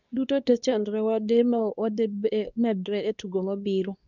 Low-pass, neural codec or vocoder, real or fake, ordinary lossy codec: 7.2 kHz; codec, 24 kHz, 0.9 kbps, WavTokenizer, medium speech release version 2; fake; none